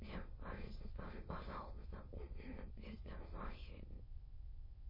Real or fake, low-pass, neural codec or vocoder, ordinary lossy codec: fake; 5.4 kHz; autoencoder, 22.05 kHz, a latent of 192 numbers a frame, VITS, trained on many speakers; MP3, 24 kbps